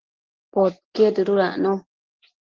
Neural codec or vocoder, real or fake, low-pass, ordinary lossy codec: none; real; 7.2 kHz; Opus, 16 kbps